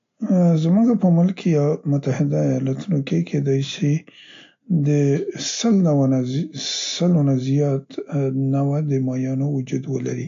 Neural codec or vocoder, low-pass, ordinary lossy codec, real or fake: none; 7.2 kHz; AAC, 48 kbps; real